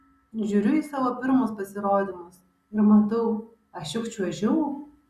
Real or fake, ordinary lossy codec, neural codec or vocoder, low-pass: real; Opus, 64 kbps; none; 14.4 kHz